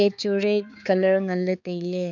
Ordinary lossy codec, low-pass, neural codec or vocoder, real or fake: none; 7.2 kHz; codec, 16 kHz, 4 kbps, X-Codec, HuBERT features, trained on balanced general audio; fake